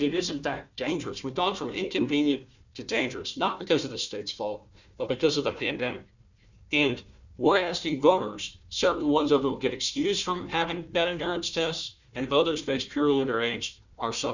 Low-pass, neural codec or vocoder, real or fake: 7.2 kHz; codec, 16 kHz, 1 kbps, FunCodec, trained on Chinese and English, 50 frames a second; fake